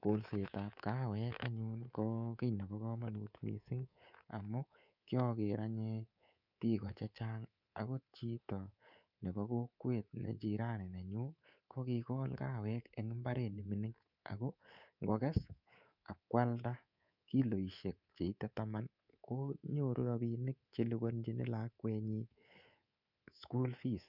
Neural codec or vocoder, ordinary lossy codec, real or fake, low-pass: codec, 24 kHz, 3.1 kbps, DualCodec; none; fake; 5.4 kHz